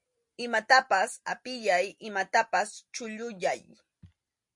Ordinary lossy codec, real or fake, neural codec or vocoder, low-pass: MP3, 48 kbps; real; none; 10.8 kHz